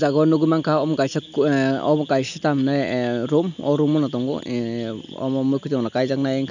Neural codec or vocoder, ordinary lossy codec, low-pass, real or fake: none; none; 7.2 kHz; real